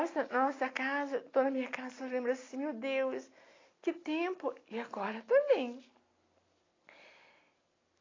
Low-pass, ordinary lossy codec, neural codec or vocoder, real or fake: 7.2 kHz; AAC, 32 kbps; none; real